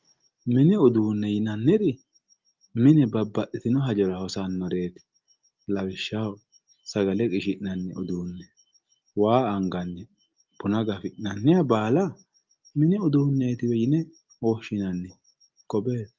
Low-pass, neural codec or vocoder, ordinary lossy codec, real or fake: 7.2 kHz; none; Opus, 32 kbps; real